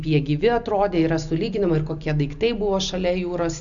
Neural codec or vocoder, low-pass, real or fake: none; 7.2 kHz; real